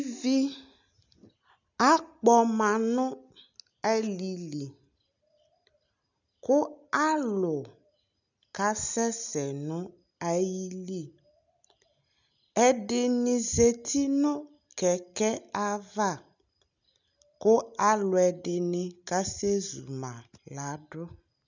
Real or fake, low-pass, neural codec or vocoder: real; 7.2 kHz; none